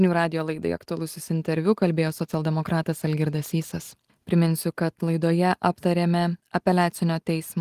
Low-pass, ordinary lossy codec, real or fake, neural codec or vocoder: 14.4 kHz; Opus, 24 kbps; real; none